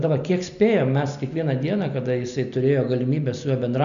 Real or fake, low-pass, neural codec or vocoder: real; 7.2 kHz; none